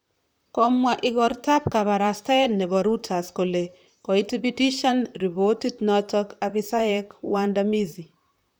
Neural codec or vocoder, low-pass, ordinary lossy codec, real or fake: vocoder, 44.1 kHz, 128 mel bands, Pupu-Vocoder; none; none; fake